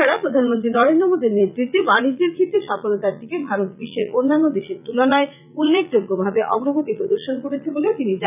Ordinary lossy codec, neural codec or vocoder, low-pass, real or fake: none; vocoder, 44.1 kHz, 80 mel bands, Vocos; 3.6 kHz; fake